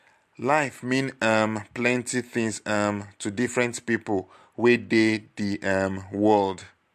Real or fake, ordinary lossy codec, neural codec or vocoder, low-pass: real; MP3, 64 kbps; none; 14.4 kHz